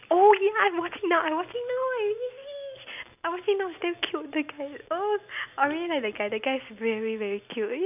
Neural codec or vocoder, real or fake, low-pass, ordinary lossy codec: none; real; 3.6 kHz; none